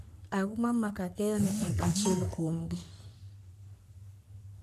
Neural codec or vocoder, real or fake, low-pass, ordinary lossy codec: codec, 44.1 kHz, 3.4 kbps, Pupu-Codec; fake; 14.4 kHz; none